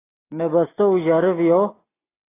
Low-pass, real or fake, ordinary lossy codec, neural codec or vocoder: 3.6 kHz; real; AAC, 16 kbps; none